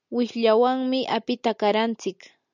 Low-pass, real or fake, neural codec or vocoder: 7.2 kHz; real; none